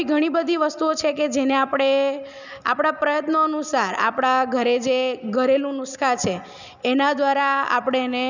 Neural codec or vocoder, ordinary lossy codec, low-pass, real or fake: none; none; 7.2 kHz; real